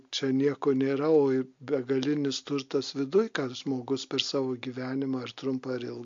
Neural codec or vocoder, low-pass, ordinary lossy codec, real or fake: none; 7.2 kHz; MP3, 48 kbps; real